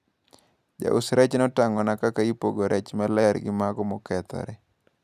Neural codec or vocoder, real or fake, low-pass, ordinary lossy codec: none; real; 14.4 kHz; none